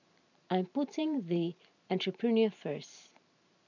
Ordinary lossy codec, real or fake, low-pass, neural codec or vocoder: none; fake; 7.2 kHz; vocoder, 44.1 kHz, 128 mel bands every 512 samples, BigVGAN v2